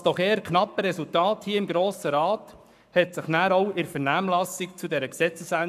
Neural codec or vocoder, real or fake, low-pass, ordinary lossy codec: codec, 44.1 kHz, 7.8 kbps, Pupu-Codec; fake; 14.4 kHz; none